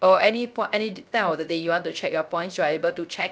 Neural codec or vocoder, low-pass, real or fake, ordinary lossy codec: codec, 16 kHz, 0.3 kbps, FocalCodec; none; fake; none